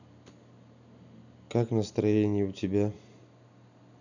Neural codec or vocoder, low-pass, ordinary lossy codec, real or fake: none; 7.2 kHz; none; real